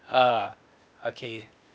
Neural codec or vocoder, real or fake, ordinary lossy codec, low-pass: codec, 16 kHz, 0.8 kbps, ZipCodec; fake; none; none